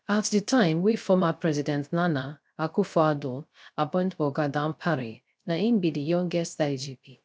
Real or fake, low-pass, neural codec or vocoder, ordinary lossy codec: fake; none; codec, 16 kHz, 0.3 kbps, FocalCodec; none